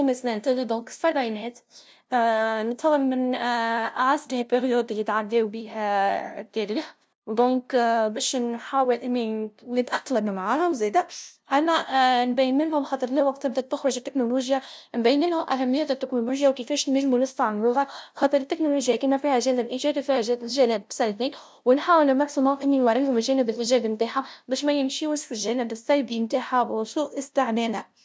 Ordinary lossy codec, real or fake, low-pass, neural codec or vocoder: none; fake; none; codec, 16 kHz, 0.5 kbps, FunCodec, trained on LibriTTS, 25 frames a second